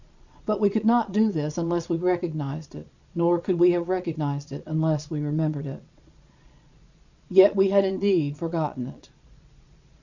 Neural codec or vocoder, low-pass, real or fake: vocoder, 22.05 kHz, 80 mel bands, Vocos; 7.2 kHz; fake